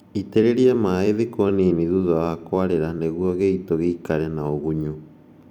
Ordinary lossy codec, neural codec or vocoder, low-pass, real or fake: none; vocoder, 44.1 kHz, 128 mel bands every 256 samples, BigVGAN v2; 19.8 kHz; fake